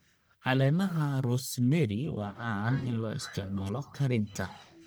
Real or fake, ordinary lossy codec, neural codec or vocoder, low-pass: fake; none; codec, 44.1 kHz, 1.7 kbps, Pupu-Codec; none